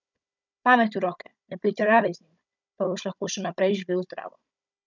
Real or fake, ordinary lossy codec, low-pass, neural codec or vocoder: fake; none; 7.2 kHz; codec, 16 kHz, 16 kbps, FunCodec, trained on Chinese and English, 50 frames a second